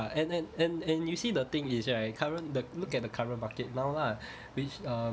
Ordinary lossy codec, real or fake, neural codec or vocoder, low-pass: none; real; none; none